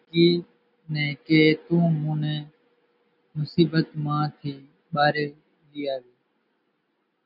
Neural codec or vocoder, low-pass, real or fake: none; 5.4 kHz; real